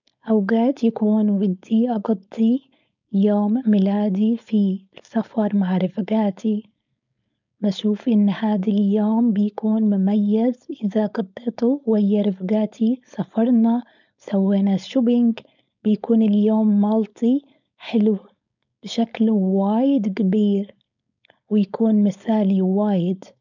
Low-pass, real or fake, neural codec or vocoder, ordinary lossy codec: 7.2 kHz; fake; codec, 16 kHz, 4.8 kbps, FACodec; none